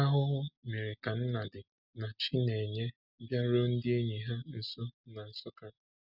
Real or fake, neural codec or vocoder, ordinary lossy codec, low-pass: real; none; none; 5.4 kHz